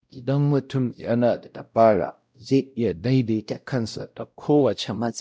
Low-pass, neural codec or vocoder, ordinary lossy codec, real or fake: none; codec, 16 kHz, 0.5 kbps, X-Codec, WavLM features, trained on Multilingual LibriSpeech; none; fake